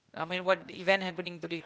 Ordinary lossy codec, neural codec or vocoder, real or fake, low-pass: none; codec, 16 kHz, 0.8 kbps, ZipCodec; fake; none